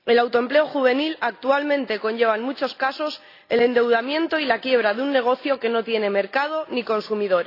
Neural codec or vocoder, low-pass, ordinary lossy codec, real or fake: none; 5.4 kHz; AAC, 32 kbps; real